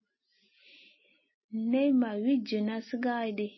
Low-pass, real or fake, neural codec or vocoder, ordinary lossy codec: 7.2 kHz; real; none; MP3, 24 kbps